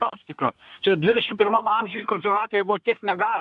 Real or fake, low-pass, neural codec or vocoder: fake; 10.8 kHz; codec, 24 kHz, 1 kbps, SNAC